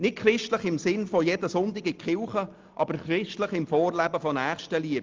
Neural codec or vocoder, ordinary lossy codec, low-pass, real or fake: none; Opus, 32 kbps; 7.2 kHz; real